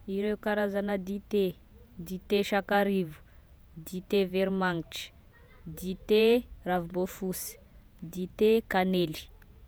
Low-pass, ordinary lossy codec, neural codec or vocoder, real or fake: none; none; vocoder, 48 kHz, 128 mel bands, Vocos; fake